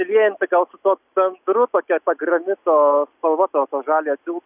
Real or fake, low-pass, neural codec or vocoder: real; 3.6 kHz; none